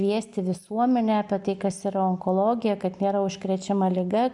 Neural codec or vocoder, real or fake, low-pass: none; real; 10.8 kHz